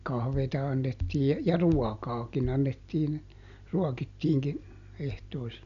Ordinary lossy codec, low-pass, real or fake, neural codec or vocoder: none; 7.2 kHz; real; none